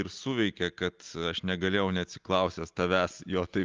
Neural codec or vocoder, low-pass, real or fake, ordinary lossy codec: none; 7.2 kHz; real; Opus, 32 kbps